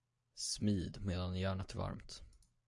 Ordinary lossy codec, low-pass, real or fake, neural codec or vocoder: AAC, 64 kbps; 10.8 kHz; real; none